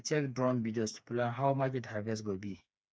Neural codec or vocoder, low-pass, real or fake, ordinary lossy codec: codec, 16 kHz, 4 kbps, FreqCodec, smaller model; none; fake; none